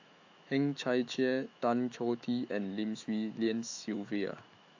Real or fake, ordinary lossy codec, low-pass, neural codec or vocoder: fake; AAC, 48 kbps; 7.2 kHz; autoencoder, 48 kHz, 128 numbers a frame, DAC-VAE, trained on Japanese speech